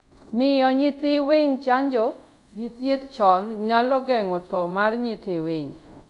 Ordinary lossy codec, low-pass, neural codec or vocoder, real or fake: none; 10.8 kHz; codec, 24 kHz, 0.5 kbps, DualCodec; fake